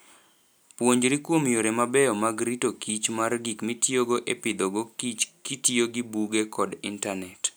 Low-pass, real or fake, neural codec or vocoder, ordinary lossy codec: none; real; none; none